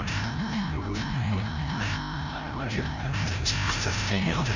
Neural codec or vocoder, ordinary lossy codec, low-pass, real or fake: codec, 16 kHz, 0.5 kbps, FreqCodec, larger model; Opus, 64 kbps; 7.2 kHz; fake